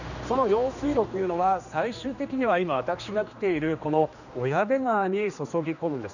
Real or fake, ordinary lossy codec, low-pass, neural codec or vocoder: fake; none; 7.2 kHz; codec, 16 kHz, 2 kbps, X-Codec, HuBERT features, trained on general audio